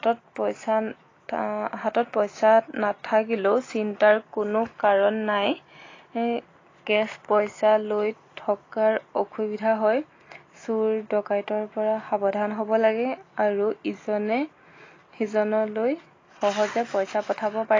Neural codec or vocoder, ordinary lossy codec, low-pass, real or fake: none; AAC, 32 kbps; 7.2 kHz; real